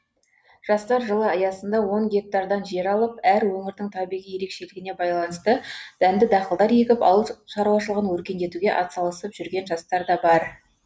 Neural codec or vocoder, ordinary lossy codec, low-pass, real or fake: none; none; none; real